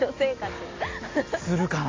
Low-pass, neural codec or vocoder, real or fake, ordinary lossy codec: 7.2 kHz; none; real; AAC, 32 kbps